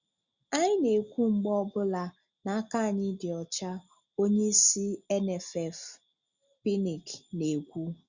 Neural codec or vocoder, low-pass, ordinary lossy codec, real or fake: none; 7.2 kHz; Opus, 64 kbps; real